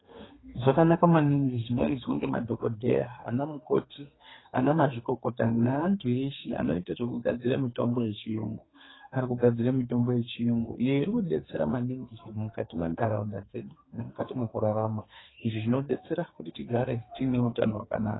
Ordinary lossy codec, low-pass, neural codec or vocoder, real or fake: AAC, 16 kbps; 7.2 kHz; codec, 32 kHz, 1.9 kbps, SNAC; fake